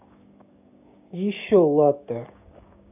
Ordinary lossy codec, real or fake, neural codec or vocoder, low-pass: none; fake; codec, 16 kHz, 6 kbps, DAC; 3.6 kHz